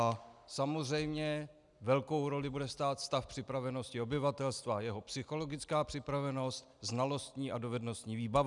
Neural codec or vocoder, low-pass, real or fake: none; 10.8 kHz; real